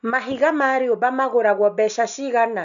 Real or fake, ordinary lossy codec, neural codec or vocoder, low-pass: real; none; none; 7.2 kHz